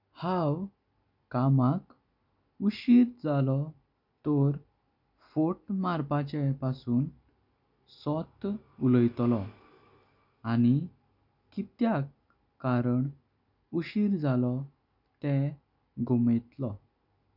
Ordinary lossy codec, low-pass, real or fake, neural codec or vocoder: none; 5.4 kHz; real; none